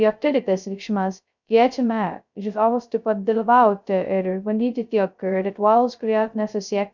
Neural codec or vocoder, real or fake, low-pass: codec, 16 kHz, 0.2 kbps, FocalCodec; fake; 7.2 kHz